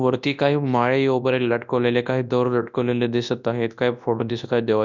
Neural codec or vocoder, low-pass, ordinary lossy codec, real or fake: codec, 24 kHz, 0.9 kbps, WavTokenizer, large speech release; 7.2 kHz; none; fake